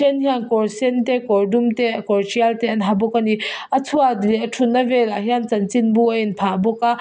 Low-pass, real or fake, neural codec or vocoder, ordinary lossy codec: none; real; none; none